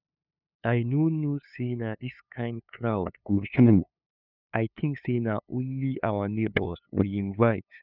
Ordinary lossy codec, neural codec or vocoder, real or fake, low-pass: none; codec, 16 kHz, 2 kbps, FunCodec, trained on LibriTTS, 25 frames a second; fake; 5.4 kHz